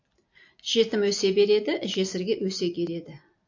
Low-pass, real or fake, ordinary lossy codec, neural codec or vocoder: 7.2 kHz; real; AAC, 48 kbps; none